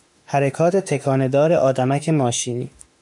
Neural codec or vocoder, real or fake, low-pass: autoencoder, 48 kHz, 32 numbers a frame, DAC-VAE, trained on Japanese speech; fake; 10.8 kHz